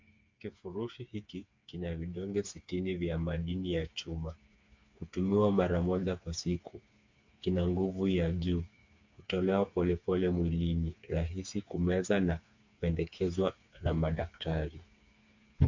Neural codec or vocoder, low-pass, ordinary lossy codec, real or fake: codec, 16 kHz, 4 kbps, FreqCodec, smaller model; 7.2 kHz; MP3, 48 kbps; fake